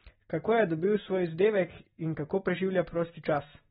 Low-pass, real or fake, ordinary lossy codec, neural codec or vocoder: 19.8 kHz; real; AAC, 16 kbps; none